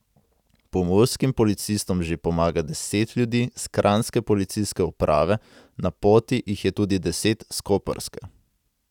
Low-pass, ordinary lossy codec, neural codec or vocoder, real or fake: 19.8 kHz; none; none; real